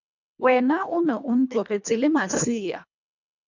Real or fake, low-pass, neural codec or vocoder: fake; 7.2 kHz; codec, 24 kHz, 1.5 kbps, HILCodec